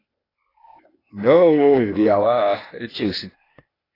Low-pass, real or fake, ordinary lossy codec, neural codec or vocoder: 5.4 kHz; fake; AAC, 24 kbps; codec, 16 kHz, 0.8 kbps, ZipCodec